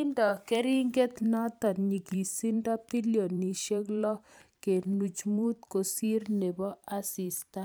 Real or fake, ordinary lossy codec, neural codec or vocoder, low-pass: fake; none; vocoder, 44.1 kHz, 128 mel bands every 512 samples, BigVGAN v2; none